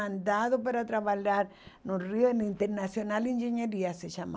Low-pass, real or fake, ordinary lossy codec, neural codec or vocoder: none; real; none; none